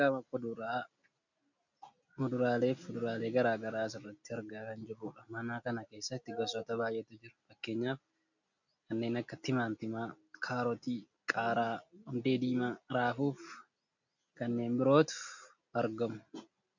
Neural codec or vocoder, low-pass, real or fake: none; 7.2 kHz; real